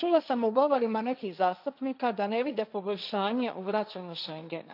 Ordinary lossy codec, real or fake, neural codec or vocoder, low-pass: none; fake; codec, 16 kHz, 1.1 kbps, Voila-Tokenizer; 5.4 kHz